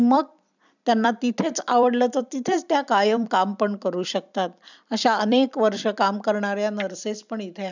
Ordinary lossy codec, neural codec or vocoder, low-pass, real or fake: none; vocoder, 44.1 kHz, 128 mel bands every 256 samples, BigVGAN v2; 7.2 kHz; fake